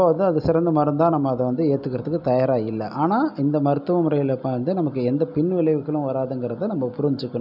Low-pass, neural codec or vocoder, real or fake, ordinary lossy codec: 5.4 kHz; none; real; none